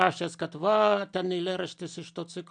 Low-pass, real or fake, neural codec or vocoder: 9.9 kHz; real; none